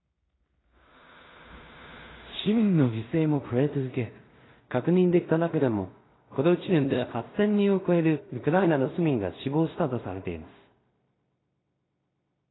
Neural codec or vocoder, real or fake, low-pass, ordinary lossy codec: codec, 16 kHz in and 24 kHz out, 0.4 kbps, LongCat-Audio-Codec, two codebook decoder; fake; 7.2 kHz; AAC, 16 kbps